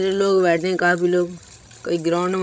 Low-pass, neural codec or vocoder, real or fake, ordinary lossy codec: none; codec, 16 kHz, 16 kbps, FreqCodec, larger model; fake; none